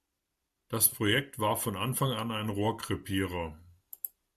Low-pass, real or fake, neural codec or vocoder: 14.4 kHz; real; none